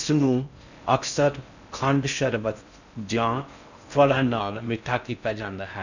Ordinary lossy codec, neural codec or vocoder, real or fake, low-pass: none; codec, 16 kHz in and 24 kHz out, 0.6 kbps, FocalCodec, streaming, 4096 codes; fake; 7.2 kHz